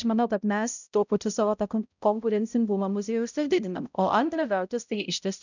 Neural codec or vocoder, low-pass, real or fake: codec, 16 kHz, 0.5 kbps, X-Codec, HuBERT features, trained on balanced general audio; 7.2 kHz; fake